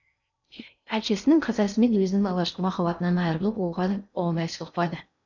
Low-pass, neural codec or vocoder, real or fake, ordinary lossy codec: 7.2 kHz; codec, 16 kHz in and 24 kHz out, 0.6 kbps, FocalCodec, streaming, 4096 codes; fake; none